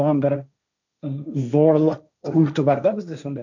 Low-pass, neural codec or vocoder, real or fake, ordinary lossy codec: 7.2 kHz; codec, 16 kHz, 1.1 kbps, Voila-Tokenizer; fake; none